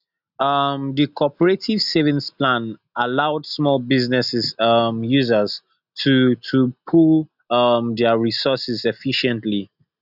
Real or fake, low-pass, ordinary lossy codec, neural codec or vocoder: real; 5.4 kHz; none; none